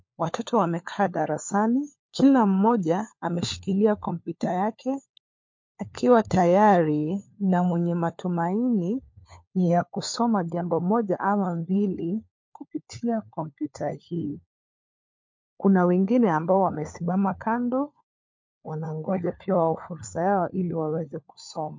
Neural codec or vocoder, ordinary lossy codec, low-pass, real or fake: codec, 16 kHz, 4 kbps, FunCodec, trained on LibriTTS, 50 frames a second; MP3, 48 kbps; 7.2 kHz; fake